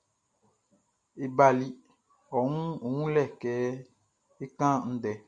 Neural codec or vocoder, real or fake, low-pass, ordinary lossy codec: none; real; 9.9 kHz; MP3, 96 kbps